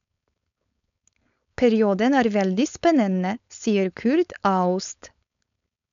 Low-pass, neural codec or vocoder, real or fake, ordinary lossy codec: 7.2 kHz; codec, 16 kHz, 4.8 kbps, FACodec; fake; none